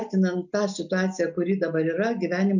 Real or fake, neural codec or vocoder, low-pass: real; none; 7.2 kHz